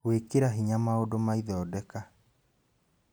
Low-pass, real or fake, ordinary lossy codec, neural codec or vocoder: none; real; none; none